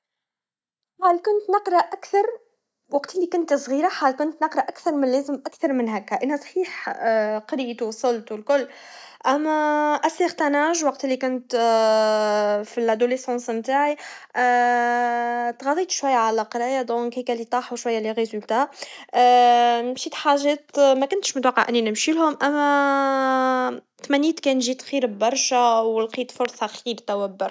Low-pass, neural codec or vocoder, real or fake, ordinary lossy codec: none; none; real; none